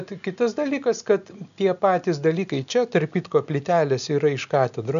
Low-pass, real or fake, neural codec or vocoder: 7.2 kHz; real; none